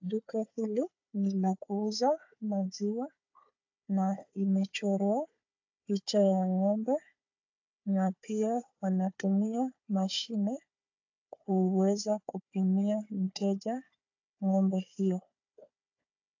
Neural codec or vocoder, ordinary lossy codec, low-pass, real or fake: codec, 16 kHz, 4 kbps, FunCodec, trained on Chinese and English, 50 frames a second; AAC, 48 kbps; 7.2 kHz; fake